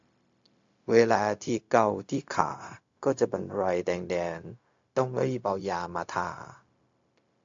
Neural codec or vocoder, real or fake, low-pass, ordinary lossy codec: codec, 16 kHz, 0.4 kbps, LongCat-Audio-Codec; fake; 7.2 kHz; AAC, 48 kbps